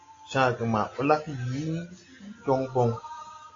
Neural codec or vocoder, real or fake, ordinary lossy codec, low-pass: none; real; AAC, 32 kbps; 7.2 kHz